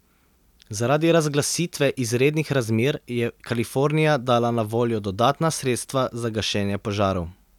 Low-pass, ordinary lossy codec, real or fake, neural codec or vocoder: 19.8 kHz; none; real; none